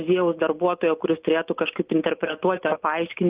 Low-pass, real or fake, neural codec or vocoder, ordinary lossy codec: 3.6 kHz; real; none; Opus, 24 kbps